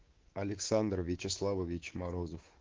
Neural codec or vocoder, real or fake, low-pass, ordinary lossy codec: codec, 24 kHz, 3.1 kbps, DualCodec; fake; 7.2 kHz; Opus, 16 kbps